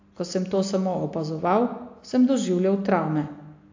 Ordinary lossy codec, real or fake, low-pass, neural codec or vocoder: AAC, 48 kbps; real; 7.2 kHz; none